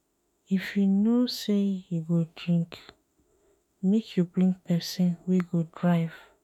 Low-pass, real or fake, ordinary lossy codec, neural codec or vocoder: 19.8 kHz; fake; none; autoencoder, 48 kHz, 32 numbers a frame, DAC-VAE, trained on Japanese speech